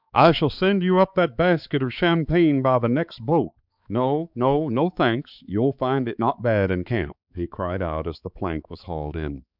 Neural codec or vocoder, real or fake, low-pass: codec, 16 kHz, 4 kbps, X-Codec, HuBERT features, trained on balanced general audio; fake; 5.4 kHz